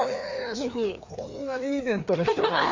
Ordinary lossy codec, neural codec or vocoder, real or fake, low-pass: MP3, 48 kbps; codec, 16 kHz, 2 kbps, FreqCodec, larger model; fake; 7.2 kHz